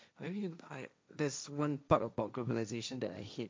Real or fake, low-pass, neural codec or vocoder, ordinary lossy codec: fake; none; codec, 16 kHz, 1.1 kbps, Voila-Tokenizer; none